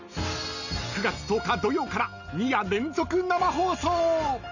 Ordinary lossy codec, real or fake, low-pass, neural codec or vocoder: none; real; 7.2 kHz; none